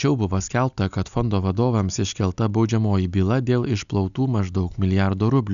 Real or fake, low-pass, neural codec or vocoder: real; 7.2 kHz; none